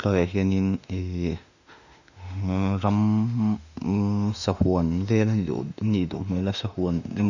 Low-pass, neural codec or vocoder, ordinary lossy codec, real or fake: 7.2 kHz; autoencoder, 48 kHz, 32 numbers a frame, DAC-VAE, trained on Japanese speech; none; fake